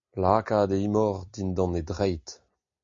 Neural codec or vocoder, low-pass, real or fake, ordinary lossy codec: none; 7.2 kHz; real; MP3, 48 kbps